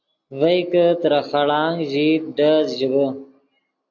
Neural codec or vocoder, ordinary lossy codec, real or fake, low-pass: none; AAC, 48 kbps; real; 7.2 kHz